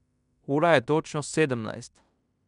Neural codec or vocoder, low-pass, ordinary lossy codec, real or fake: codec, 16 kHz in and 24 kHz out, 0.9 kbps, LongCat-Audio-Codec, fine tuned four codebook decoder; 10.8 kHz; none; fake